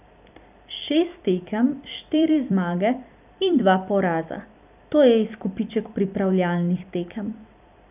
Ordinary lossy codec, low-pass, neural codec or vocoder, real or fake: none; 3.6 kHz; vocoder, 44.1 kHz, 128 mel bands every 256 samples, BigVGAN v2; fake